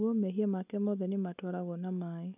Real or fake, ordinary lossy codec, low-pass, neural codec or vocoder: real; none; 3.6 kHz; none